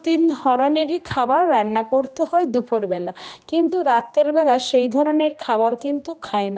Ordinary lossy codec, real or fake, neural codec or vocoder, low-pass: none; fake; codec, 16 kHz, 1 kbps, X-Codec, HuBERT features, trained on general audio; none